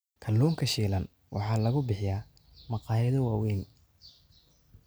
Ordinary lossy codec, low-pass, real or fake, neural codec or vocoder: none; none; real; none